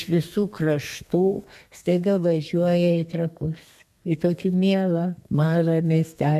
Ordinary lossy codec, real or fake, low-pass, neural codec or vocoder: MP3, 96 kbps; fake; 14.4 kHz; codec, 32 kHz, 1.9 kbps, SNAC